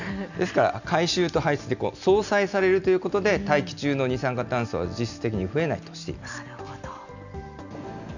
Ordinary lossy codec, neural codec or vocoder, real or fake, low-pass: none; none; real; 7.2 kHz